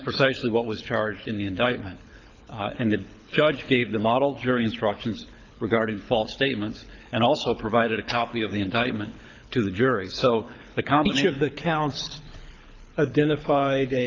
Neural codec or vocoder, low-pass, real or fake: codec, 24 kHz, 6 kbps, HILCodec; 7.2 kHz; fake